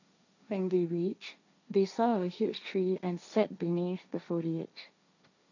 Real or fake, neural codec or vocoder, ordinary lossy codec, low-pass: fake; codec, 16 kHz, 1.1 kbps, Voila-Tokenizer; none; 7.2 kHz